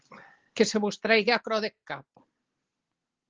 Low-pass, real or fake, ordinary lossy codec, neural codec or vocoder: 7.2 kHz; real; Opus, 16 kbps; none